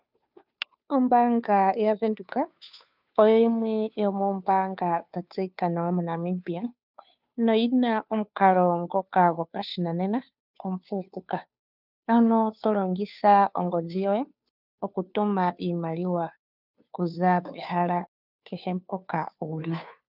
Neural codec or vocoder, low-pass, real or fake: codec, 16 kHz, 2 kbps, FunCodec, trained on Chinese and English, 25 frames a second; 5.4 kHz; fake